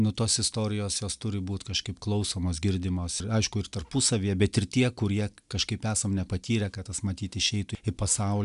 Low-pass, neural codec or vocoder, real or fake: 10.8 kHz; none; real